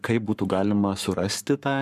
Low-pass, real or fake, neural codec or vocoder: 14.4 kHz; fake; codec, 44.1 kHz, 7.8 kbps, Pupu-Codec